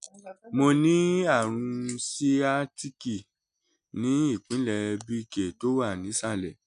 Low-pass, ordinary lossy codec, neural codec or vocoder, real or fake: 9.9 kHz; none; none; real